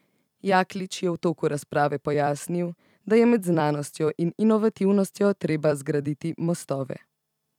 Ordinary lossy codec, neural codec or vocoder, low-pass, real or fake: none; vocoder, 44.1 kHz, 128 mel bands every 256 samples, BigVGAN v2; 19.8 kHz; fake